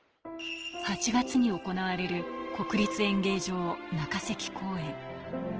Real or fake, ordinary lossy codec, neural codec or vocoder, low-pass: real; Opus, 16 kbps; none; 7.2 kHz